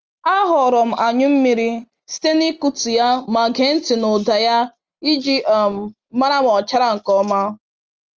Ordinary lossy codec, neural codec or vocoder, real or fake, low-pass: Opus, 24 kbps; none; real; 7.2 kHz